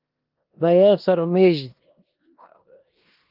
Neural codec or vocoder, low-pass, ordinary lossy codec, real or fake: codec, 16 kHz in and 24 kHz out, 0.9 kbps, LongCat-Audio-Codec, four codebook decoder; 5.4 kHz; Opus, 32 kbps; fake